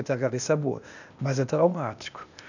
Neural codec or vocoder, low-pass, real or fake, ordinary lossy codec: codec, 16 kHz, 0.8 kbps, ZipCodec; 7.2 kHz; fake; none